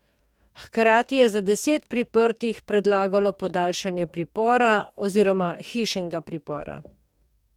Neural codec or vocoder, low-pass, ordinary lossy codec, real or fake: codec, 44.1 kHz, 2.6 kbps, DAC; 19.8 kHz; MP3, 96 kbps; fake